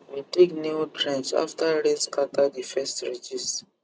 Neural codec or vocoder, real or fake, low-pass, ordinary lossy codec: none; real; none; none